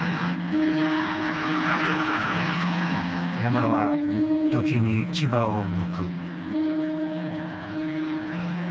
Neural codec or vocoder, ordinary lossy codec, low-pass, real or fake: codec, 16 kHz, 2 kbps, FreqCodec, smaller model; none; none; fake